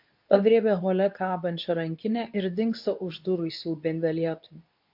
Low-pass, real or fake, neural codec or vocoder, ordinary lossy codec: 5.4 kHz; fake; codec, 24 kHz, 0.9 kbps, WavTokenizer, medium speech release version 2; MP3, 48 kbps